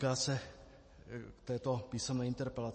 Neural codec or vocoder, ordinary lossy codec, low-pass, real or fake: none; MP3, 32 kbps; 9.9 kHz; real